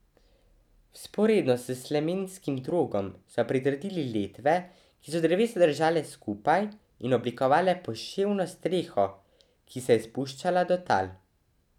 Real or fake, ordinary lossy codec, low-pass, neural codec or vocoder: real; none; 19.8 kHz; none